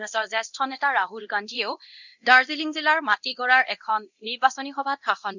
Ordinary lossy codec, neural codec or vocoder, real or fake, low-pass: none; codec, 24 kHz, 0.9 kbps, DualCodec; fake; 7.2 kHz